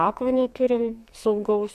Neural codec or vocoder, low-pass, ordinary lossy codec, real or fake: codec, 44.1 kHz, 2.6 kbps, SNAC; 14.4 kHz; MP3, 96 kbps; fake